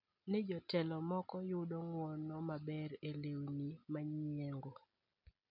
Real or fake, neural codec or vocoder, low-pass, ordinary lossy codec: real; none; 5.4 kHz; none